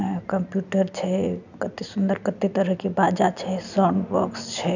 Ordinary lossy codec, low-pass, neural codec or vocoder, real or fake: none; 7.2 kHz; none; real